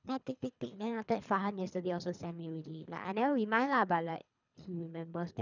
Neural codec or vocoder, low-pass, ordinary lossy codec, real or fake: codec, 24 kHz, 3 kbps, HILCodec; 7.2 kHz; none; fake